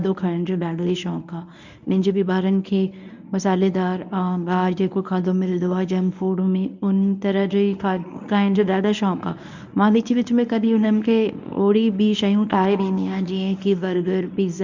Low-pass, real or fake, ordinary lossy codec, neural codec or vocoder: 7.2 kHz; fake; none; codec, 24 kHz, 0.9 kbps, WavTokenizer, medium speech release version 1